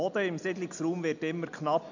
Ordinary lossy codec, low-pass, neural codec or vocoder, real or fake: none; 7.2 kHz; none; real